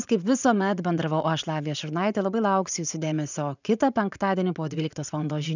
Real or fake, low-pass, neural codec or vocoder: fake; 7.2 kHz; vocoder, 44.1 kHz, 80 mel bands, Vocos